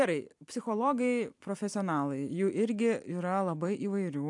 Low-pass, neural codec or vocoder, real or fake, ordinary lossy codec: 10.8 kHz; vocoder, 44.1 kHz, 128 mel bands every 256 samples, BigVGAN v2; fake; AAC, 64 kbps